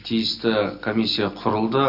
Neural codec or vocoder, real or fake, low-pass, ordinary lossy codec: none; real; 5.4 kHz; none